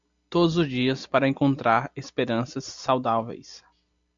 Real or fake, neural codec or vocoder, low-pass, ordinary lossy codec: real; none; 7.2 kHz; MP3, 64 kbps